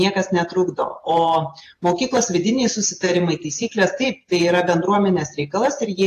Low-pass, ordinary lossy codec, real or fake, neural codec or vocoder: 14.4 kHz; AAC, 48 kbps; fake; vocoder, 48 kHz, 128 mel bands, Vocos